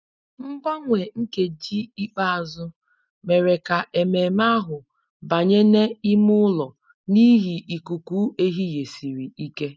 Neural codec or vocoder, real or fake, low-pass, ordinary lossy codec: none; real; none; none